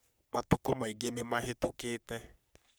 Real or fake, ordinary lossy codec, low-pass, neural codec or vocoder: fake; none; none; codec, 44.1 kHz, 3.4 kbps, Pupu-Codec